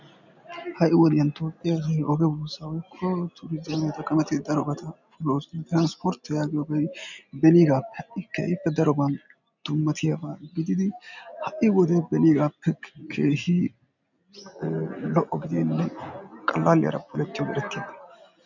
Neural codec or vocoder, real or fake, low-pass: none; real; 7.2 kHz